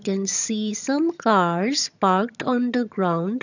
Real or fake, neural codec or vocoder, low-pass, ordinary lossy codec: fake; vocoder, 22.05 kHz, 80 mel bands, HiFi-GAN; 7.2 kHz; none